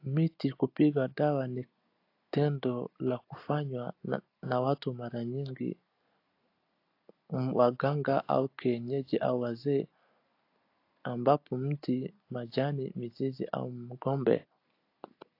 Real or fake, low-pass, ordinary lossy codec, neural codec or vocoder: real; 5.4 kHz; AAC, 32 kbps; none